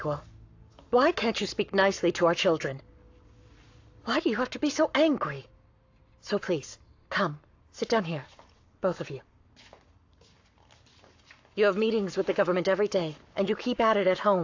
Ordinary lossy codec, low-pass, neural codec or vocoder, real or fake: AAC, 48 kbps; 7.2 kHz; codec, 44.1 kHz, 7.8 kbps, Pupu-Codec; fake